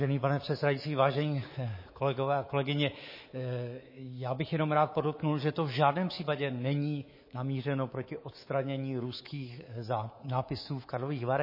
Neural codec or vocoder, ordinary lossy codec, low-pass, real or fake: codec, 24 kHz, 3.1 kbps, DualCodec; MP3, 24 kbps; 5.4 kHz; fake